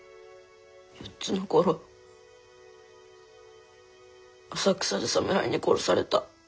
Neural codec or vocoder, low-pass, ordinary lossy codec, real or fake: none; none; none; real